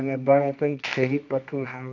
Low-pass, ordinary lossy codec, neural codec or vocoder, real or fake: 7.2 kHz; none; codec, 24 kHz, 0.9 kbps, WavTokenizer, medium music audio release; fake